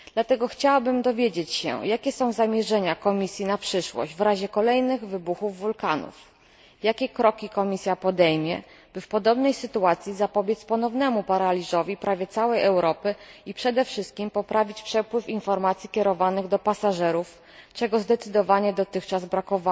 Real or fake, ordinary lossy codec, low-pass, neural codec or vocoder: real; none; none; none